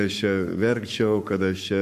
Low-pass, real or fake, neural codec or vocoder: 14.4 kHz; fake; codec, 44.1 kHz, 7.8 kbps, Pupu-Codec